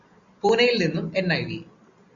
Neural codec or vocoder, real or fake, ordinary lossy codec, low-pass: none; real; Opus, 64 kbps; 7.2 kHz